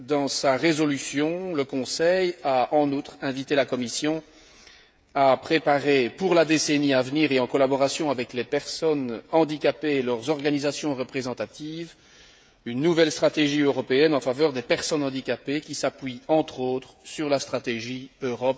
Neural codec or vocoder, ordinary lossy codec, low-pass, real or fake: codec, 16 kHz, 16 kbps, FreqCodec, smaller model; none; none; fake